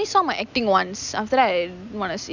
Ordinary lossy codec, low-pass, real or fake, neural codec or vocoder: none; 7.2 kHz; real; none